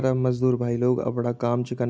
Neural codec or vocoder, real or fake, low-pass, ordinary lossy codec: none; real; none; none